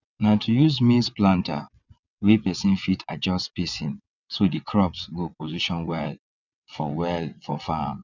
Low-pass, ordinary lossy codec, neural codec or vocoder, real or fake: 7.2 kHz; none; vocoder, 22.05 kHz, 80 mel bands, WaveNeXt; fake